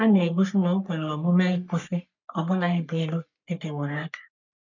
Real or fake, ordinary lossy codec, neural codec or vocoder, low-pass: fake; none; codec, 44.1 kHz, 3.4 kbps, Pupu-Codec; 7.2 kHz